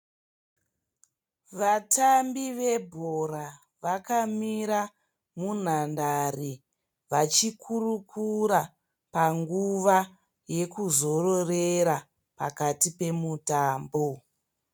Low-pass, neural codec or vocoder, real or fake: 19.8 kHz; none; real